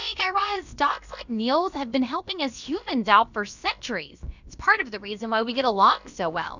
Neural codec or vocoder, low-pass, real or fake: codec, 16 kHz, about 1 kbps, DyCAST, with the encoder's durations; 7.2 kHz; fake